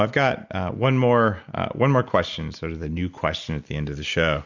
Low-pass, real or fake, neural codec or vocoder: 7.2 kHz; real; none